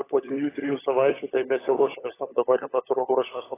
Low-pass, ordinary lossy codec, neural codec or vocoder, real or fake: 3.6 kHz; AAC, 16 kbps; codec, 16 kHz, 16 kbps, FunCodec, trained on LibriTTS, 50 frames a second; fake